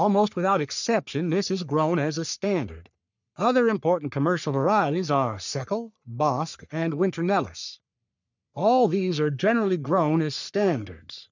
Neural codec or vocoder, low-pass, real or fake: codec, 44.1 kHz, 3.4 kbps, Pupu-Codec; 7.2 kHz; fake